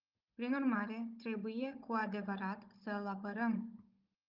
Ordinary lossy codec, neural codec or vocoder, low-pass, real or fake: Opus, 32 kbps; codec, 16 kHz, 16 kbps, FunCodec, trained on Chinese and English, 50 frames a second; 5.4 kHz; fake